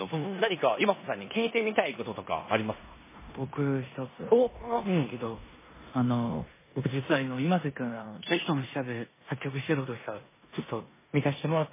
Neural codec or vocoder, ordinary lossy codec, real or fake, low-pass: codec, 16 kHz in and 24 kHz out, 0.9 kbps, LongCat-Audio-Codec, four codebook decoder; MP3, 16 kbps; fake; 3.6 kHz